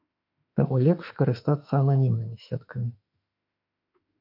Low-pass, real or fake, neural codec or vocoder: 5.4 kHz; fake; autoencoder, 48 kHz, 32 numbers a frame, DAC-VAE, trained on Japanese speech